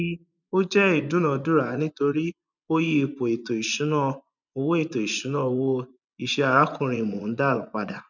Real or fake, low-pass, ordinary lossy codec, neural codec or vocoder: fake; 7.2 kHz; none; vocoder, 24 kHz, 100 mel bands, Vocos